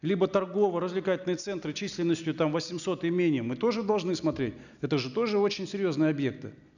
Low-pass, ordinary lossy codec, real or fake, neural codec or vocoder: 7.2 kHz; none; real; none